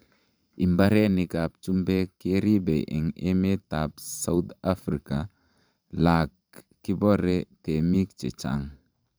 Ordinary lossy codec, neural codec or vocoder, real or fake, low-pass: none; vocoder, 44.1 kHz, 128 mel bands every 512 samples, BigVGAN v2; fake; none